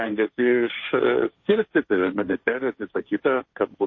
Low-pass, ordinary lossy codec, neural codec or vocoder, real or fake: 7.2 kHz; MP3, 32 kbps; codec, 16 kHz, 1.1 kbps, Voila-Tokenizer; fake